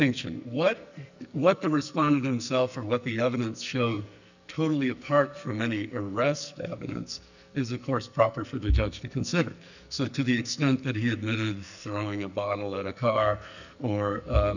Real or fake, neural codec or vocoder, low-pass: fake; codec, 44.1 kHz, 2.6 kbps, SNAC; 7.2 kHz